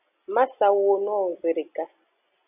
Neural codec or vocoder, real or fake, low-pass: none; real; 3.6 kHz